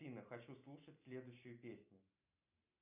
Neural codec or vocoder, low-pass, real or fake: none; 3.6 kHz; real